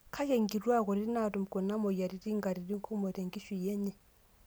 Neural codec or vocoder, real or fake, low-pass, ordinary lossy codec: none; real; none; none